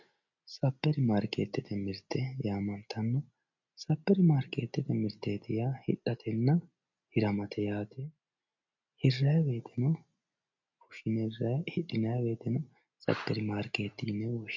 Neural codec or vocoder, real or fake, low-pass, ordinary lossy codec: none; real; 7.2 kHz; MP3, 48 kbps